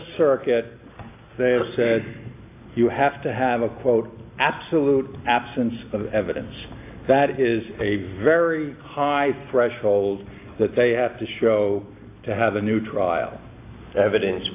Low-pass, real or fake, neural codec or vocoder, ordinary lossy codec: 3.6 kHz; real; none; AAC, 24 kbps